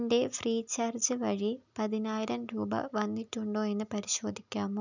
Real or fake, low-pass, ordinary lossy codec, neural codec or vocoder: real; 7.2 kHz; none; none